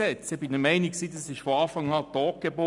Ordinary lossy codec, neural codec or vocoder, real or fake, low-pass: none; none; real; 10.8 kHz